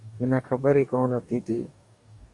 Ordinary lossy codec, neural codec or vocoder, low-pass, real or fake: MP3, 64 kbps; codec, 44.1 kHz, 2.6 kbps, DAC; 10.8 kHz; fake